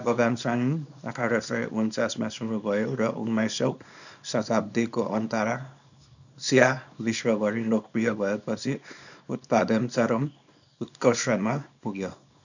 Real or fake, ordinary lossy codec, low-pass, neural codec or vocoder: fake; none; 7.2 kHz; codec, 24 kHz, 0.9 kbps, WavTokenizer, small release